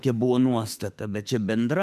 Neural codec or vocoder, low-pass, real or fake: autoencoder, 48 kHz, 32 numbers a frame, DAC-VAE, trained on Japanese speech; 14.4 kHz; fake